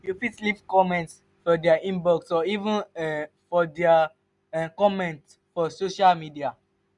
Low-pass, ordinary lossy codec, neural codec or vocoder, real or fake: 10.8 kHz; none; none; real